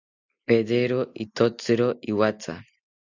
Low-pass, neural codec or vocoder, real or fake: 7.2 kHz; none; real